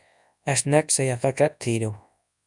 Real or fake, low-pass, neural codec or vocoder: fake; 10.8 kHz; codec, 24 kHz, 0.9 kbps, WavTokenizer, large speech release